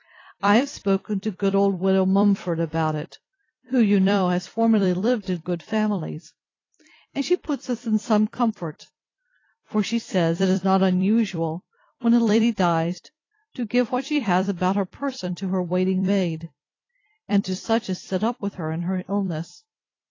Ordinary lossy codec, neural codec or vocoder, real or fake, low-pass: AAC, 32 kbps; vocoder, 44.1 kHz, 128 mel bands every 256 samples, BigVGAN v2; fake; 7.2 kHz